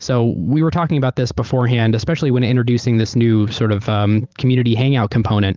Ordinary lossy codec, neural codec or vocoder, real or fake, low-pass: Opus, 24 kbps; vocoder, 44.1 kHz, 128 mel bands every 512 samples, BigVGAN v2; fake; 7.2 kHz